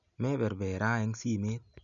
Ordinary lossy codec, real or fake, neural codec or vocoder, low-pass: none; real; none; 7.2 kHz